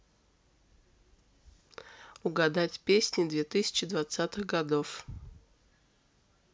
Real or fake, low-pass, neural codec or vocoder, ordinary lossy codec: real; none; none; none